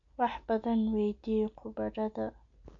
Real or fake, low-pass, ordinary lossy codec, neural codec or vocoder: real; 7.2 kHz; AAC, 64 kbps; none